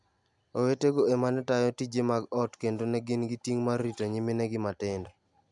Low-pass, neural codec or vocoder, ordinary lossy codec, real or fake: 10.8 kHz; none; none; real